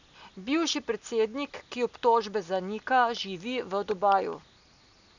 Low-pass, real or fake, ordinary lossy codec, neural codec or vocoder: 7.2 kHz; real; none; none